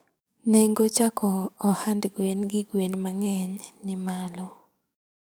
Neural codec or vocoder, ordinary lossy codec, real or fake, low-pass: codec, 44.1 kHz, 7.8 kbps, DAC; none; fake; none